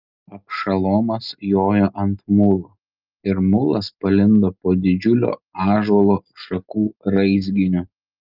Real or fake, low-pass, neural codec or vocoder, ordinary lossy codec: real; 5.4 kHz; none; Opus, 24 kbps